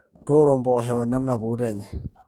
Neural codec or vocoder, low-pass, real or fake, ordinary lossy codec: codec, 44.1 kHz, 2.6 kbps, DAC; 19.8 kHz; fake; none